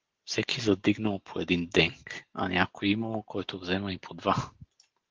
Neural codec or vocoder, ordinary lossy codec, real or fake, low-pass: none; Opus, 16 kbps; real; 7.2 kHz